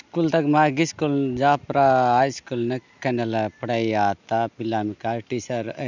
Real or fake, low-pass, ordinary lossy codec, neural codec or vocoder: real; 7.2 kHz; none; none